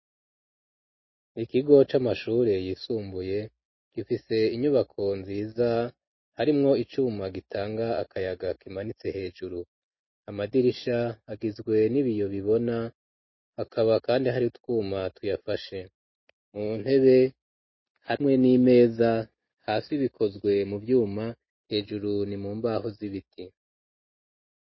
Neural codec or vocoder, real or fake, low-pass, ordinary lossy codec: none; real; 7.2 kHz; MP3, 24 kbps